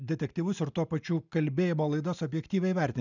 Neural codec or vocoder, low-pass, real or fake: none; 7.2 kHz; real